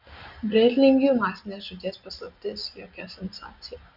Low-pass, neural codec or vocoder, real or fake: 5.4 kHz; none; real